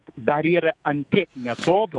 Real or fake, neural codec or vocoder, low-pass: fake; codec, 44.1 kHz, 2.6 kbps, SNAC; 10.8 kHz